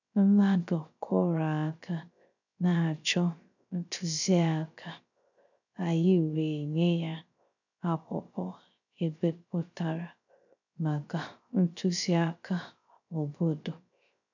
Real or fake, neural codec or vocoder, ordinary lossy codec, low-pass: fake; codec, 16 kHz, 0.3 kbps, FocalCodec; none; 7.2 kHz